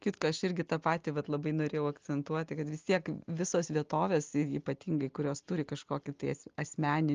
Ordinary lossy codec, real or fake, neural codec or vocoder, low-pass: Opus, 32 kbps; real; none; 7.2 kHz